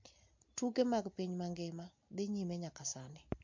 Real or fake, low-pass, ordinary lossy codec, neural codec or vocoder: real; 7.2 kHz; MP3, 48 kbps; none